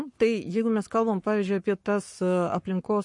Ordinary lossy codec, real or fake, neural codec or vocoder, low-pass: MP3, 48 kbps; fake; codec, 44.1 kHz, 7.8 kbps, Pupu-Codec; 10.8 kHz